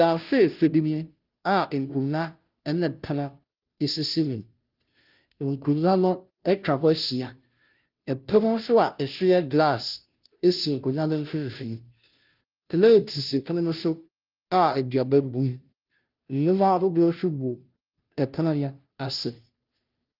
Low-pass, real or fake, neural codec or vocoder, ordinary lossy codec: 5.4 kHz; fake; codec, 16 kHz, 0.5 kbps, FunCodec, trained on Chinese and English, 25 frames a second; Opus, 32 kbps